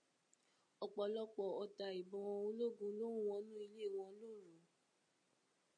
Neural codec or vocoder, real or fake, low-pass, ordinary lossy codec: none; real; 9.9 kHz; MP3, 48 kbps